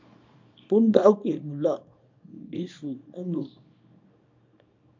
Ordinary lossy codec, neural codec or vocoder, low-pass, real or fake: MP3, 64 kbps; codec, 24 kHz, 0.9 kbps, WavTokenizer, small release; 7.2 kHz; fake